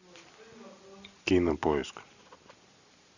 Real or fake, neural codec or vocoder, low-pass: real; none; 7.2 kHz